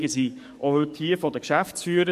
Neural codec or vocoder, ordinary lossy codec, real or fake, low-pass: codec, 44.1 kHz, 7.8 kbps, DAC; MP3, 64 kbps; fake; 14.4 kHz